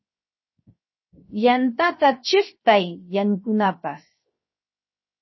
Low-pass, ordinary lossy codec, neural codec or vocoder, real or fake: 7.2 kHz; MP3, 24 kbps; codec, 16 kHz, 0.7 kbps, FocalCodec; fake